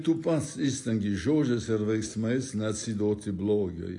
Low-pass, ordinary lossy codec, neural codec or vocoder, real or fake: 10.8 kHz; AAC, 48 kbps; none; real